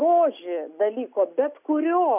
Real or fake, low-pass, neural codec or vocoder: real; 3.6 kHz; none